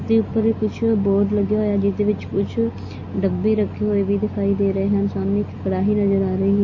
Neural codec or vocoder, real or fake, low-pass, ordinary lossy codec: none; real; 7.2 kHz; MP3, 32 kbps